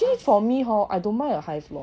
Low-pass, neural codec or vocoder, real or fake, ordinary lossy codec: none; none; real; none